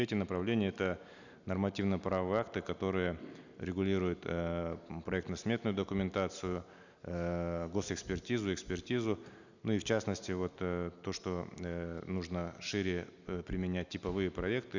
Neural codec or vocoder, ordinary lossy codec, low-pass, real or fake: none; none; 7.2 kHz; real